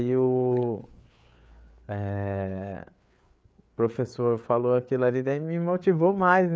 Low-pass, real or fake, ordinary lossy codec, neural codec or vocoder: none; fake; none; codec, 16 kHz, 4 kbps, FreqCodec, larger model